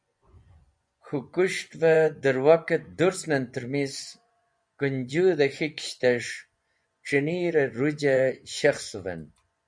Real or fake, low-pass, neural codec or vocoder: fake; 9.9 kHz; vocoder, 24 kHz, 100 mel bands, Vocos